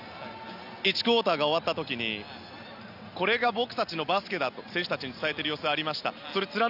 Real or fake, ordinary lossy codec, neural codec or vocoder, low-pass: real; none; none; 5.4 kHz